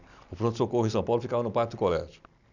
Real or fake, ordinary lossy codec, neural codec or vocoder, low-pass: real; none; none; 7.2 kHz